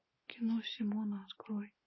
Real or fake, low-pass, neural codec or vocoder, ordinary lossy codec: real; 7.2 kHz; none; MP3, 24 kbps